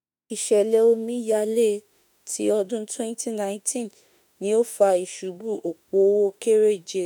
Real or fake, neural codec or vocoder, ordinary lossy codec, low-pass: fake; autoencoder, 48 kHz, 32 numbers a frame, DAC-VAE, trained on Japanese speech; none; none